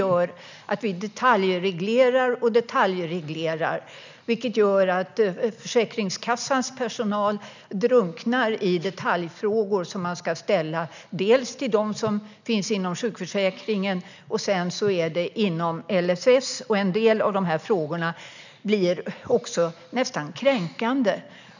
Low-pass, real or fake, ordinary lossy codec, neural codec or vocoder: 7.2 kHz; real; none; none